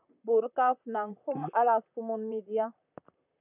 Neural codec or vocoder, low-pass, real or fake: vocoder, 44.1 kHz, 128 mel bands, Pupu-Vocoder; 3.6 kHz; fake